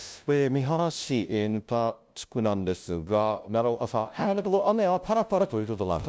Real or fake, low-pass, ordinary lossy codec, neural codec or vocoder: fake; none; none; codec, 16 kHz, 0.5 kbps, FunCodec, trained on LibriTTS, 25 frames a second